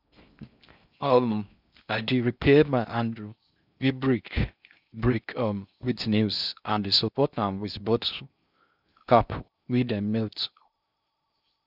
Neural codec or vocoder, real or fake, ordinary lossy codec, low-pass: codec, 16 kHz in and 24 kHz out, 0.6 kbps, FocalCodec, streaming, 4096 codes; fake; none; 5.4 kHz